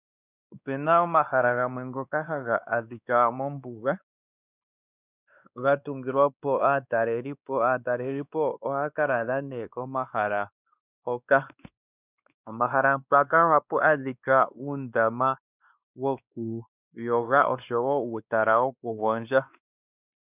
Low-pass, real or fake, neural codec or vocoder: 3.6 kHz; fake; codec, 16 kHz, 2 kbps, X-Codec, WavLM features, trained on Multilingual LibriSpeech